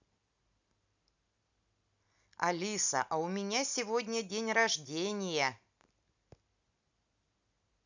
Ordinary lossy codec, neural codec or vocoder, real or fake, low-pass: none; none; real; 7.2 kHz